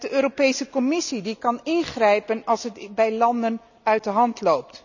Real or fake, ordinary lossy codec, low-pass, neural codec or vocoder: real; none; 7.2 kHz; none